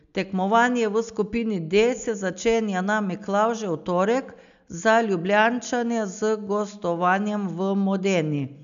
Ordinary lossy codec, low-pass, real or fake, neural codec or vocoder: none; 7.2 kHz; real; none